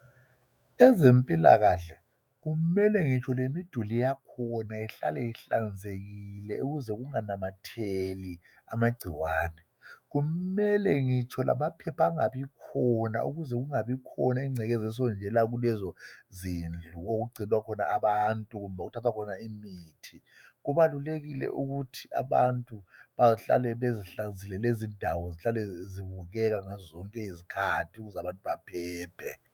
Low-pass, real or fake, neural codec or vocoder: 19.8 kHz; fake; autoencoder, 48 kHz, 128 numbers a frame, DAC-VAE, trained on Japanese speech